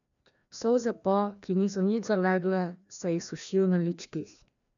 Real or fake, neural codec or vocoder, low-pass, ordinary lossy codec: fake; codec, 16 kHz, 1 kbps, FreqCodec, larger model; 7.2 kHz; none